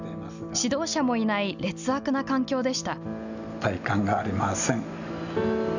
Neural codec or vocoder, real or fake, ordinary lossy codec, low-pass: none; real; none; 7.2 kHz